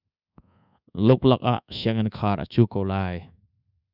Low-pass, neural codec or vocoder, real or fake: 5.4 kHz; codec, 24 kHz, 1.2 kbps, DualCodec; fake